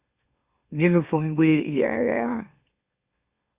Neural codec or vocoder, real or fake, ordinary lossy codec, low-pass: autoencoder, 44.1 kHz, a latent of 192 numbers a frame, MeloTTS; fake; Opus, 64 kbps; 3.6 kHz